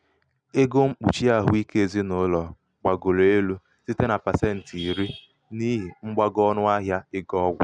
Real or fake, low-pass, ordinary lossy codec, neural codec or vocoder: real; 9.9 kHz; none; none